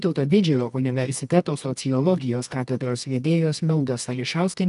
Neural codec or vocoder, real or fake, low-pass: codec, 24 kHz, 0.9 kbps, WavTokenizer, medium music audio release; fake; 10.8 kHz